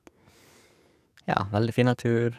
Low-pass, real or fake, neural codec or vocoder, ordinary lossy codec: 14.4 kHz; fake; codec, 44.1 kHz, 7.8 kbps, DAC; none